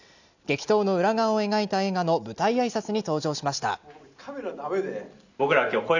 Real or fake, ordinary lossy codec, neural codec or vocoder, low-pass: real; none; none; 7.2 kHz